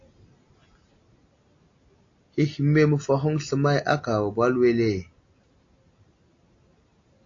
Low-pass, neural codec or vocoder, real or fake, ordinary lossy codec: 7.2 kHz; none; real; AAC, 48 kbps